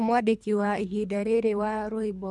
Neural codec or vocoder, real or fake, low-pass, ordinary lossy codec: codec, 24 kHz, 3 kbps, HILCodec; fake; none; none